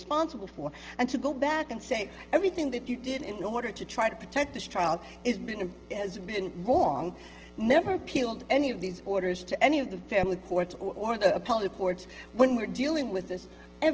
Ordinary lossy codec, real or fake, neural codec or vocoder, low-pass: Opus, 16 kbps; real; none; 7.2 kHz